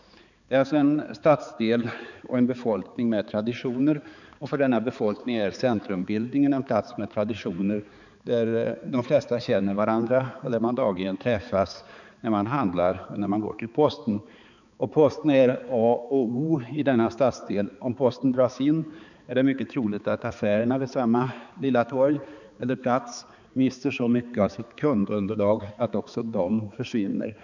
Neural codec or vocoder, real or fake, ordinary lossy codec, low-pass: codec, 16 kHz, 4 kbps, X-Codec, HuBERT features, trained on balanced general audio; fake; none; 7.2 kHz